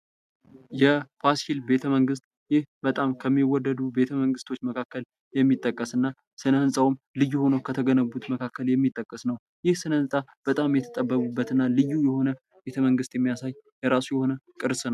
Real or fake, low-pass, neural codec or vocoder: real; 14.4 kHz; none